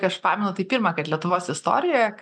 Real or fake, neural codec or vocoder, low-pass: real; none; 9.9 kHz